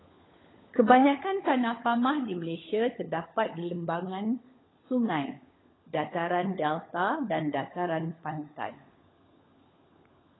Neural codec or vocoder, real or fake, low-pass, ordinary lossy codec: codec, 16 kHz, 16 kbps, FunCodec, trained on LibriTTS, 50 frames a second; fake; 7.2 kHz; AAC, 16 kbps